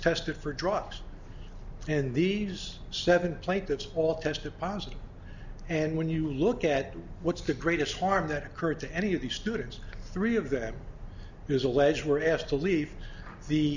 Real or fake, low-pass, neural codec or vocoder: real; 7.2 kHz; none